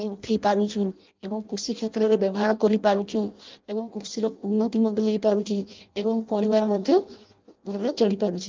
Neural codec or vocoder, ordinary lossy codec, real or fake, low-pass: codec, 16 kHz in and 24 kHz out, 0.6 kbps, FireRedTTS-2 codec; Opus, 24 kbps; fake; 7.2 kHz